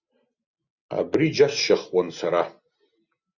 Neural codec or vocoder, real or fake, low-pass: none; real; 7.2 kHz